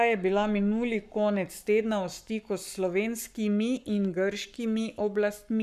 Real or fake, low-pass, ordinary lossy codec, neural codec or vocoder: fake; 14.4 kHz; none; codec, 44.1 kHz, 7.8 kbps, Pupu-Codec